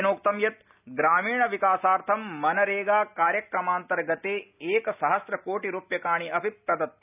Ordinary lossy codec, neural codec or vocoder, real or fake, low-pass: none; none; real; 3.6 kHz